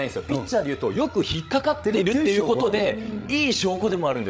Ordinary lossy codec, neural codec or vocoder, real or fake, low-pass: none; codec, 16 kHz, 16 kbps, FreqCodec, larger model; fake; none